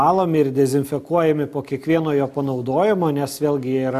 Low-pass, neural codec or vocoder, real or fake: 14.4 kHz; none; real